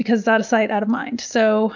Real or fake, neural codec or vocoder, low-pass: real; none; 7.2 kHz